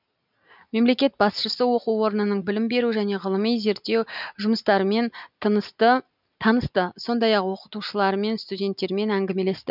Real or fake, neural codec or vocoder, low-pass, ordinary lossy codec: real; none; 5.4 kHz; none